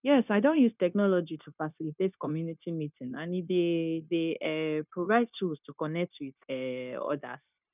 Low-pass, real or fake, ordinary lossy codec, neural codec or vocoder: 3.6 kHz; fake; none; codec, 16 kHz, 0.9 kbps, LongCat-Audio-Codec